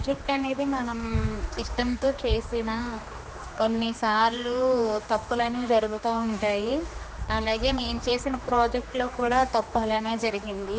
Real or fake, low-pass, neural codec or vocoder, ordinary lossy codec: fake; none; codec, 16 kHz, 2 kbps, X-Codec, HuBERT features, trained on general audio; none